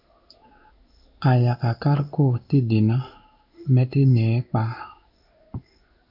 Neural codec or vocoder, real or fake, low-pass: codec, 16 kHz in and 24 kHz out, 1 kbps, XY-Tokenizer; fake; 5.4 kHz